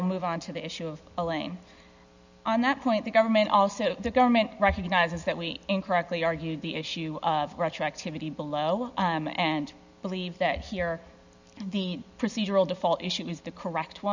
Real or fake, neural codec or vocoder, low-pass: real; none; 7.2 kHz